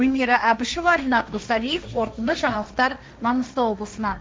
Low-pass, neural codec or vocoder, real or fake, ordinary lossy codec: none; codec, 16 kHz, 1.1 kbps, Voila-Tokenizer; fake; none